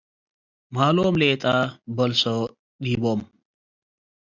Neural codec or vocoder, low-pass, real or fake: none; 7.2 kHz; real